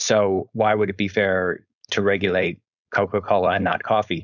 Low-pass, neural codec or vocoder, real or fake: 7.2 kHz; codec, 16 kHz, 4.8 kbps, FACodec; fake